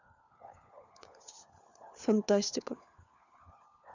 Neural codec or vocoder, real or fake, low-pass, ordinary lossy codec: codec, 16 kHz, 4 kbps, FunCodec, trained on LibriTTS, 50 frames a second; fake; 7.2 kHz; none